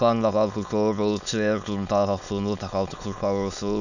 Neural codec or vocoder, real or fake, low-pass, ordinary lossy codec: autoencoder, 22.05 kHz, a latent of 192 numbers a frame, VITS, trained on many speakers; fake; 7.2 kHz; none